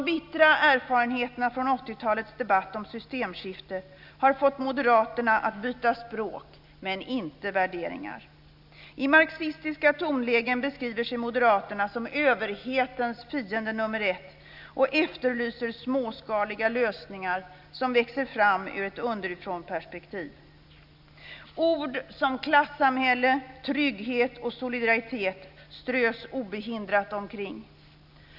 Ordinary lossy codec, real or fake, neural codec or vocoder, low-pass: none; real; none; 5.4 kHz